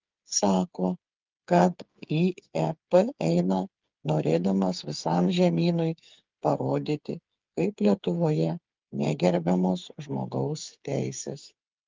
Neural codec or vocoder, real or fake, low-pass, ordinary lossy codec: codec, 16 kHz, 8 kbps, FreqCodec, smaller model; fake; 7.2 kHz; Opus, 32 kbps